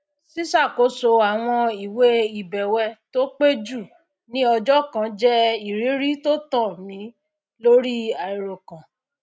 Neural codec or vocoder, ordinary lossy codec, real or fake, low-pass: none; none; real; none